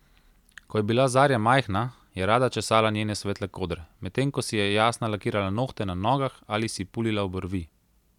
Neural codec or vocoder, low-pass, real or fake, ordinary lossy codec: none; 19.8 kHz; real; none